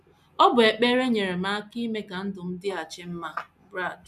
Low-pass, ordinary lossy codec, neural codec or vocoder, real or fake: 14.4 kHz; none; none; real